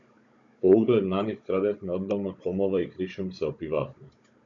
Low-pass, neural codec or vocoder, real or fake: 7.2 kHz; codec, 16 kHz, 16 kbps, FunCodec, trained on Chinese and English, 50 frames a second; fake